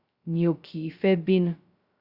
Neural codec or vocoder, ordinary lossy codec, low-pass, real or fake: codec, 16 kHz, 0.2 kbps, FocalCodec; Opus, 64 kbps; 5.4 kHz; fake